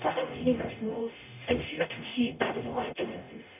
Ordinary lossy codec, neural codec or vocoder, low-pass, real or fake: none; codec, 44.1 kHz, 0.9 kbps, DAC; 3.6 kHz; fake